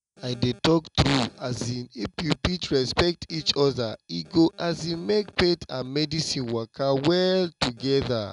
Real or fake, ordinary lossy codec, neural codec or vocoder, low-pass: real; none; none; 10.8 kHz